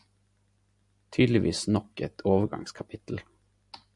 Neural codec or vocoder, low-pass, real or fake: none; 10.8 kHz; real